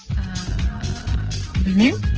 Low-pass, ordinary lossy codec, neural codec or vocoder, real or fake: 7.2 kHz; Opus, 16 kbps; none; real